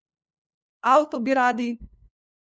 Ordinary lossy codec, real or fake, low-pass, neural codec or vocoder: none; fake; none; codec, 16 kHz, 2 kbps, FunCodec, trained on LibriTTS, 25 frames a second